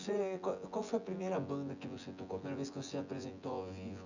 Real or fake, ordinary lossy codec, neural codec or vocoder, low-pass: fake; none; vocoder, 24 kHz, 100 mel bands, Vocos; 7.2 kHz